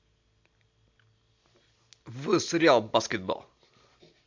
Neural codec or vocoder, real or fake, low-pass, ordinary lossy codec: none; real; 7.2 kHz; MP3, 64 kbps